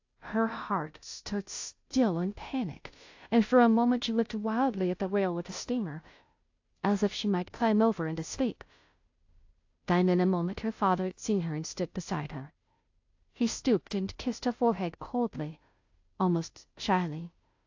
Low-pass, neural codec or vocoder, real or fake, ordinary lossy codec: 7.2 kHz; codec, 16 kHz, 0.5 kbps, FunCodec, trained on Chinese and English, 25 frames a second; fake; AAC, 48 kbps